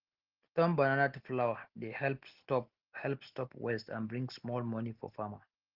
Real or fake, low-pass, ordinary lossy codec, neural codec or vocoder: real; 5.4 kHz; Opus, 16 kbps; none